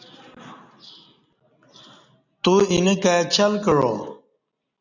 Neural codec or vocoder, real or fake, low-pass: none; real; 7.2 kHz